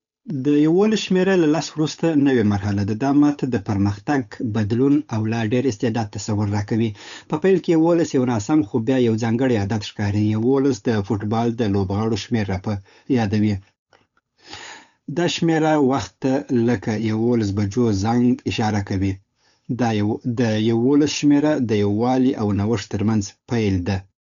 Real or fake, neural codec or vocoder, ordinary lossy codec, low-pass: fake; codec, 16 kHz, 8 kbps, FunCodec, trained on Chinese and English, 25 frames a second; none; 7.2 kHz